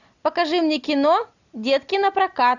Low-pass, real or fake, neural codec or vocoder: 7.2 kHz; real; none